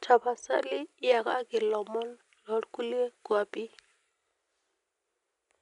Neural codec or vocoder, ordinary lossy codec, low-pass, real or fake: none; none; 10.8 kHz; real